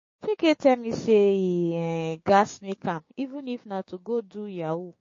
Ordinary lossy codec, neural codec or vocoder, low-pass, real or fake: MP3, 32 kbps; codec, 16 kHz, 6 kbps, DAC; 7.2 kHz; fake